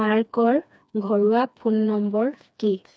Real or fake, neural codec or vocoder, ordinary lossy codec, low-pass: fake; codec, 16 kHz, 2 kbps, FreqCodec, smaller model; none; none